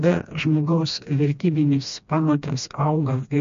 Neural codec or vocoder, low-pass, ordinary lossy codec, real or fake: codec, 16 kHz, 1 kbps, FreqCodec, smaller model; 7.2 kHz; MP3, 48 kbps; fake